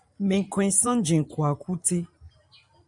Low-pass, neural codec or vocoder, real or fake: 10.8 kHz; vocoder, 44.1 kHz, 128 mel bands every 512 samples, BigVGAN v2; fake